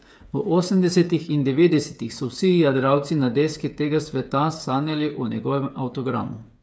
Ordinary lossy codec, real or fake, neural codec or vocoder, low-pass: none; fake; codec, 16 kHz, 8 kbps, FreqCodec, smaller model; none